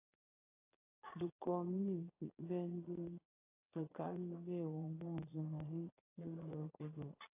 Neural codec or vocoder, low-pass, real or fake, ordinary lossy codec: vocoder, 22.05 kHz, 80 mel bands, Vocos; 3.6 kHz; fake; AAC, 24 kbps